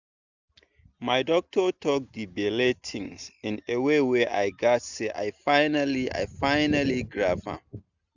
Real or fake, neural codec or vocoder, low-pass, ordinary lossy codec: real; none; 7.2 kHz; none